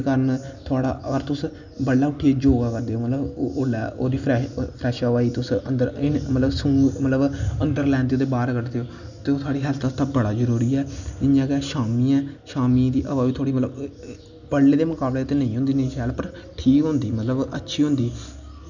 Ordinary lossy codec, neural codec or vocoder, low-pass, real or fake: none; none; 7.2 kHz; real